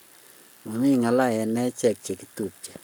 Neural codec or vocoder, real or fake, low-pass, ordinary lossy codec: codec, 44.1 kHz, 7.8 kbps, Pupu-Codec; fake; none; none